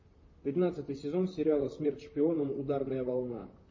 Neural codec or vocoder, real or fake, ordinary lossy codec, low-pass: vocoder, 44.1 kHz, 128 mel bands, Pupu-Vocoder; fake; MP3, 32 kbps; 7.2 kHz